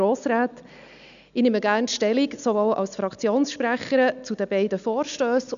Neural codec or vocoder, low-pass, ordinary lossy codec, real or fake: none; 7.2 kHz; none; real